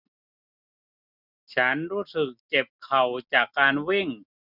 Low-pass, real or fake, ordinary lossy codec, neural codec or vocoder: 5.4 kHz; real; none; none